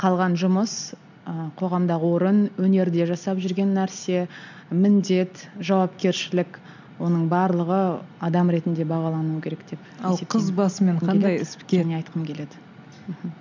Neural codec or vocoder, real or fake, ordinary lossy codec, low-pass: none; real; none; 7.2 kHz